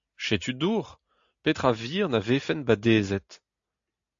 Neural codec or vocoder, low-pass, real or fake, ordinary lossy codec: none; 7.2 kHz; real; AAC, 64 kbps